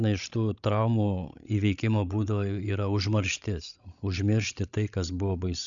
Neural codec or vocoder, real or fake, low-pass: codec, 16 kHz, 16 kbps, FreqCodec, larger model; fake; 7.2 kHz